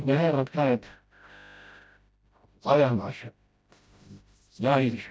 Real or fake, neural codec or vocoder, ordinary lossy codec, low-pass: fake; codec, 16 kHz, 0.5 kbps, FreqCodec, smaller model; none; none